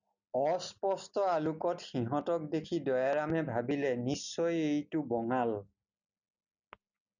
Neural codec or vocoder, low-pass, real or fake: none; 7.2 kHz; real